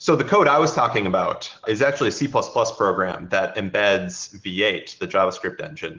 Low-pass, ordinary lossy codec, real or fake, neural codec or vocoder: 7.2 kHz; Opus, 16 kbps; real; none